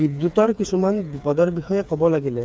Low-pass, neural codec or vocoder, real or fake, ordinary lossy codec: none; codec, 16 kHz, 4 kbps, FreqCodec, smaller model; fake; none